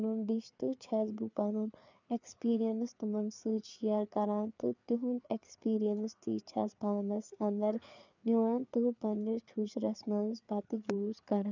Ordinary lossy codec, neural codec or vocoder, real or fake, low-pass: none; codec, 16 kHz, 4 kbps, FreqCodec, larger model; fake; 7.2 kHz